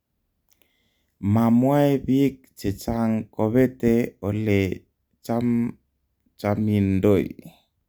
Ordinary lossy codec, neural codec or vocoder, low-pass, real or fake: none; none; none; real